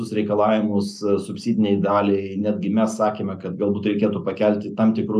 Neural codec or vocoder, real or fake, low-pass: none; real; 14.4 kHz